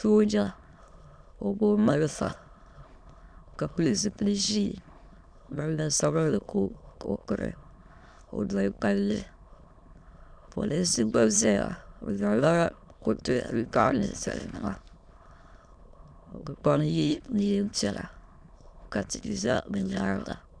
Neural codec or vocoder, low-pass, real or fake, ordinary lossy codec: autoencoder, 22.05 kHz, a latent of 192 numbers a frame, VITS, trained on many speakers; 9.9 kHz; fake; Opus, 64 kbps